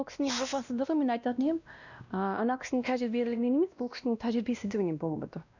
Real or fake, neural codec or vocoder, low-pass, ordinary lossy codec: fake; codec, 16 kHz, 1 kbps, X-Codec, WavLM features, trained on Multilingual LibriSpeech; 7.2 kHz; none